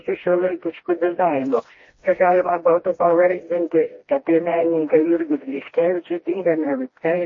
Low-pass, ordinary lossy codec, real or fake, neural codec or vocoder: 7.2 kHz; MP3, 32 kbps; fake; codec, 16 kHz, 1 kbps, FreqCodec, smaller model